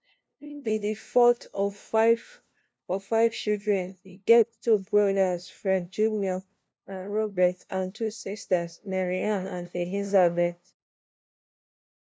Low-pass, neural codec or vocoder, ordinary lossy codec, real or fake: none; codec, 16 kHz, 0.5 kbps, FunCodec, trained on LibriTTS, 25 frames a second; none; fake